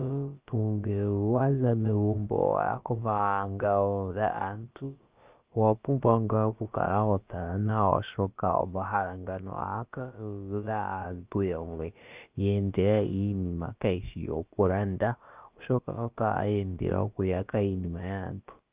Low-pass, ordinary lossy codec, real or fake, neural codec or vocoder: 3.6 kHz; Opus, 24 kbps; fake; codec, 16 kHz, about 1 kbps, DyCAST, with the encoder's durations